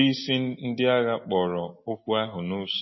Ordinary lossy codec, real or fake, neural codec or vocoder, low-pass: MP3, 24 kbps; real; none; 7.2 kHz